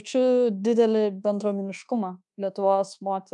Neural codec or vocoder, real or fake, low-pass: codec, 24 kHz, 1.2 kbps, DualCodec; fake; 10.8 kHz